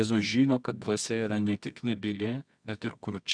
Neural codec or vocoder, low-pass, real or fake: codec, 24 kHz, 0.9 kbps, WavTokenizer, medium music audio release; 9.9 kHz; fake